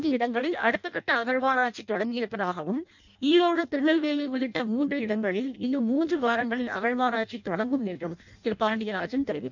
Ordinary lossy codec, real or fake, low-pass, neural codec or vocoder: none; fake; 7.2 kHz; codec, 16 kHz in and 24 kHz out, 0.6 kbps, FireRedTTS-2 codec